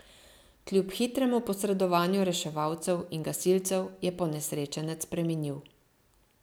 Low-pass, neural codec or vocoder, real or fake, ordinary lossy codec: none; none; real; none